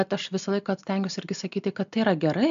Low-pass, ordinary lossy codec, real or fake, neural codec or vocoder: 7.2 kHz; MP3, 64 kbps; real; none